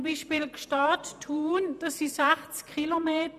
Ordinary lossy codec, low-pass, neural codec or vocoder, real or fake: none; 14.4 kHz; vocoder, 48 kHz, 128 mel bands, Vocos; fake